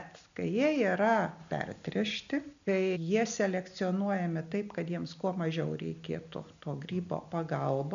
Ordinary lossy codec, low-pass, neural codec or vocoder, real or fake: MP3, 96 kbps; 7.2 kHz; none; real